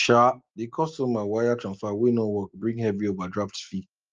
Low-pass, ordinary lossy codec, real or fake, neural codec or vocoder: 7.2 kHz; Opus, 24 kbps; real; none